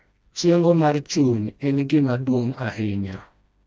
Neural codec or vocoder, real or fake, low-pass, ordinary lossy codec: codec, 16 kHz, 1 kbps, FreqCodec, smaller model; fake; none; none